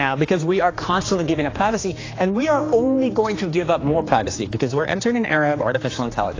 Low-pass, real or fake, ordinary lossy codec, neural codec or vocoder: 7.2 kHz; fake; AAC, 32 kbps; codec, 16 kHz, 2 kbps, X-Codec, HuBERT features, trained on general audio